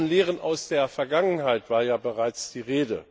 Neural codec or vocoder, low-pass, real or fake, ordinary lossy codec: none; none; real; none